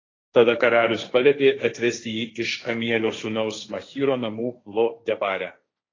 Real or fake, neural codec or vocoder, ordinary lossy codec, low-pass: fake; codec, 16 kHz, 1.1 kbps, Voila-Tokenizer; AAC, 32 kbps; 7.2 kHz